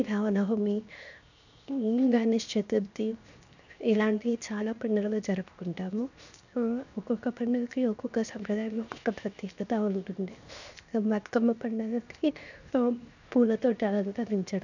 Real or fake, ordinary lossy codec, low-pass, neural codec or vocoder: fake; none; 7.2 kHz; codec, 16 kHz, 0.7 kbps, FocalCodec